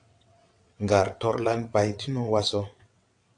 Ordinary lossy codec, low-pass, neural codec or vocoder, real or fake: AAC, 64 kbps; 9.9 kHz; vocoder, 22.05 kHz, 80 mel bands, WaveNeXt; fake